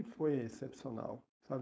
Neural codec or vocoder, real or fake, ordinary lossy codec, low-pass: codec, 16 kHz, 4.8 kbps, FACodec; fake; none; none